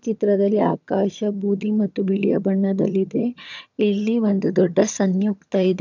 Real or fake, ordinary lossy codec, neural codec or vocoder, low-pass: fake; none; vocoder, 22.05 kHz, 80 mel bands, HiFi-GAN; 7.2 kHz